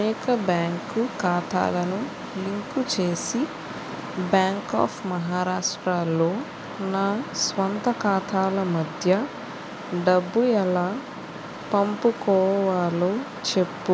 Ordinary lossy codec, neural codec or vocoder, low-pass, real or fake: none; none; none; real